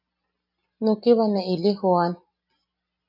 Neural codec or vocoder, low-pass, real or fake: none; 5.4 kHz; real